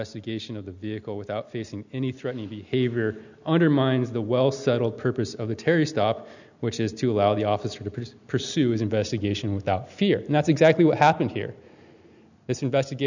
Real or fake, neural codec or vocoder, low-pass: real; none; 7.2 kHz